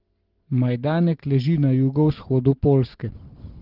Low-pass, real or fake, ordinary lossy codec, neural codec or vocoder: 5.4 kHz; real; Opus, 16 kbps; none